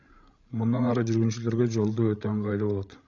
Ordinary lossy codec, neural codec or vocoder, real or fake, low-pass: AAC, 64 kbps; codec, 16 kHz, 16 kbps, FreqCodec, larger model; fake; 7.2 kHz